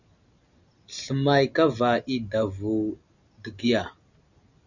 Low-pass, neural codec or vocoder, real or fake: 7.2 kHz; none; real